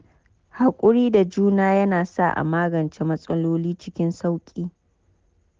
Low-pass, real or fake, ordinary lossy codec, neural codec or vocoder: 7.2 kHz; real; Opus, 16 kbps; none